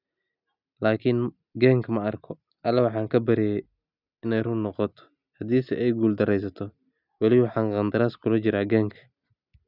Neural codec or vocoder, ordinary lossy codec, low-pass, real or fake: none; none; 5.4 kHz; real